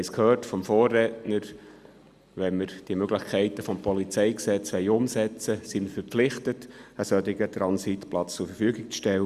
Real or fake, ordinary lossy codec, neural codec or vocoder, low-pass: real; Opus, 64 kbps; none; 14.4 kHz